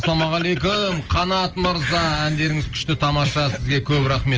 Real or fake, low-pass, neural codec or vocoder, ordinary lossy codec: real; 7.2 kHz; none; Opus, 24 kbps